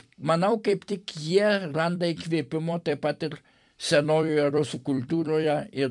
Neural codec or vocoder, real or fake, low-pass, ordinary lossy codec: vocoder, 44.1 kHz, 128 mel bands every 256 samples, BigVGAN v2; fake; 10.8 kHz; MP3, 96 kbps